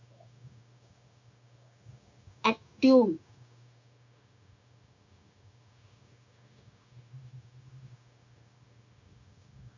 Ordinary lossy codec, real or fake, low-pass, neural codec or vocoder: MP3, 64 kbps; fake; 7.2 kHz; codec, 16 kHz, 0.9 kbps, LongCat-Audio-Codec